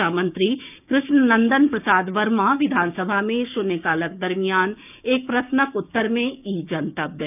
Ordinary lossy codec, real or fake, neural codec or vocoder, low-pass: none; fake; codec, 16 kHz, 6 kbps, DAC; 3.6 kHz